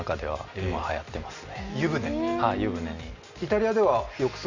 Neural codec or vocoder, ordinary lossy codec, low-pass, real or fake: none; MP3, 64 kbps; 7.2 kHz; real